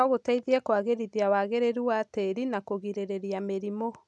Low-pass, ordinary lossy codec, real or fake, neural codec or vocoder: none; none; real; none